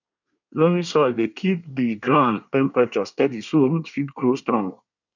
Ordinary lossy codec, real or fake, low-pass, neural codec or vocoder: none; fake; 7.2 kHz; codec, 24 kHz, 1 kbps, SNAC